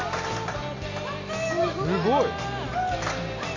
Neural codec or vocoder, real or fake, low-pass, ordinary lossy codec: none; real; 7.2 kHz; MP3, 64 kbps